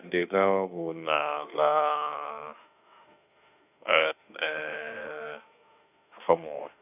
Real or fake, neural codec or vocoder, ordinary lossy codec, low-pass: fake; autoencoder, 48 kHz, 32 numbers a frame, DAC-VAE, trained on Japanese speech; none; 3.6 kHz